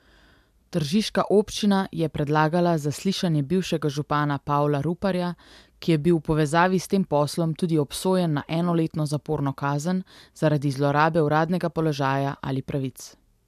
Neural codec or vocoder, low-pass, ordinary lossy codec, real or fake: none; 14.4 kHz; MP3, 96 kbps; real